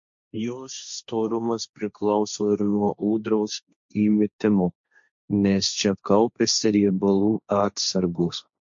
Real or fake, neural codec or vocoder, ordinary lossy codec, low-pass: fake; codec, 16 kHz, 1.1 kbps, Voila-Tokenizer; MP3, 48 kbps; 7.2 kHz